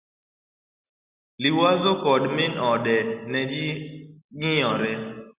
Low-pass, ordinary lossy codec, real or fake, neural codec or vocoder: 3.6 kHz; Opus, 64 kbps; real; none